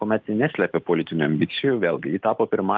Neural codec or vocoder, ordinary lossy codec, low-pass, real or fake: none; Opus, 24 kbps; 7.2 kHz; real